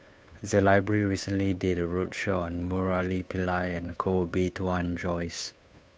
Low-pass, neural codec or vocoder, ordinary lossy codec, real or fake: none; codec, 16 kHz, 2 kbps, FunCodec, trained on Chinese and English, 25 frames a second; none; fake